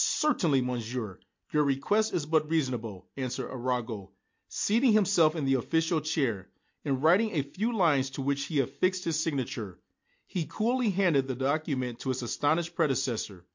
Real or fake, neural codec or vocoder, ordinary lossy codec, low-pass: real; none; MP3, 48 kbps; 7.2 kHz